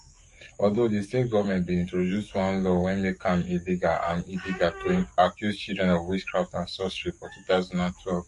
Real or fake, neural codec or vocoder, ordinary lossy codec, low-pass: fake; codec, 44.1 kHz, 7.8 kbps, DAC; MP3, 48 kbps; 14.4 kHz